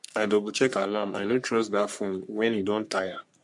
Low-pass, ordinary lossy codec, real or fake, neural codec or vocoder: 10.8 kHz; MP3, 64 kbps; fake; codec, 44.1 kHz, 3.4 kbps, Pupu-Codec